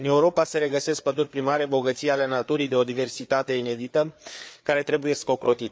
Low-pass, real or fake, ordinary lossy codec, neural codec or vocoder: none; fake; none; codec, 16 kHz, 4 kbps, FreqCodec, larger model